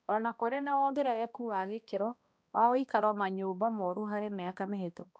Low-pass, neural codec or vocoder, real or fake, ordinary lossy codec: none; codec, 16 kHz, 1 kbps, X-Codec, HuBERT features, trained on balanced general audio; fake; none